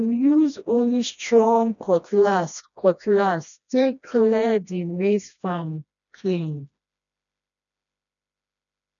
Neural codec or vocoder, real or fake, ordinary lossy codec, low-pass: codec, 16 kHz, 1 kbps, FreqCodec, smaller model; fake; none; 7.2 kHz